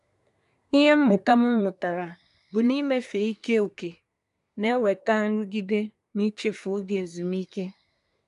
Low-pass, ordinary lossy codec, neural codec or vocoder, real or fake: 10.8 kHz; none; codec, 24 kHz, 1 kbps, SNAC; fake